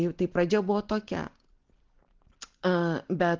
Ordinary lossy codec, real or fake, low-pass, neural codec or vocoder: Opus, 16 kbps; real; 7.2 kHz; none